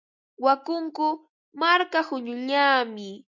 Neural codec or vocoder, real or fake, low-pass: none; real; 7.2 kHz